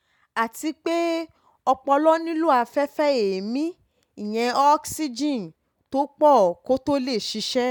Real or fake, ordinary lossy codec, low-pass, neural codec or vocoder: real; none; 19.8 kHz; none